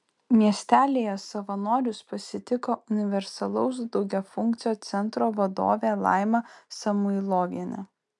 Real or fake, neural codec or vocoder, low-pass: real; none; 10.8 kHz